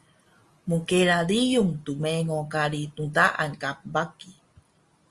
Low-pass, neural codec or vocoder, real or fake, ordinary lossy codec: 10.8 kHz; none; real; Opus, 32 kbps